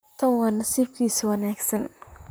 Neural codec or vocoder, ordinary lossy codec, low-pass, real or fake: vocoder, 44.1 kHz, 128 mel bands, Pupu-Vocoder; none; none; fake